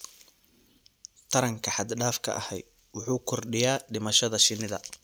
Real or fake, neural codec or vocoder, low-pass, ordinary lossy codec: real; none; none; none